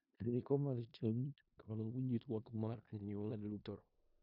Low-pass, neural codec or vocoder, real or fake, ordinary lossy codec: 5.4 kHz; codec, 16 kHz in and 24 kHz out, 0.4 kbps, LongCat-Audio-Codec, four codebook decoder; fake; none